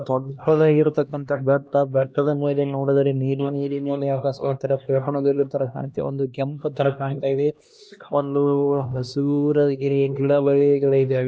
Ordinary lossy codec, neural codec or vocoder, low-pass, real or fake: none; codec, 16 kHz, 1 kbps, X-Codec, HuBERT features, trained on LibriSpeech; none; fake